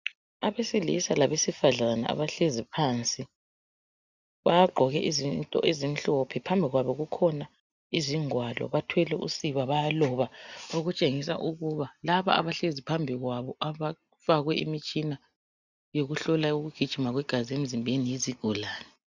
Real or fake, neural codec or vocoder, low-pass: real; none; 7.2 kHz